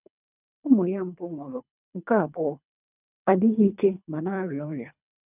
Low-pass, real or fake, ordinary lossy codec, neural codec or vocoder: 3.6 kHz; fake; none; codec, 24 kHz, 3 kbps, HILCodec